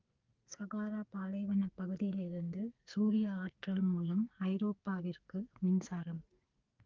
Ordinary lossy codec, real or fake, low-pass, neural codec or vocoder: Opus, 32 kbps; fake; 7.2 kHz; codec, 44.1 kHz, 2.6 kbps, SNAC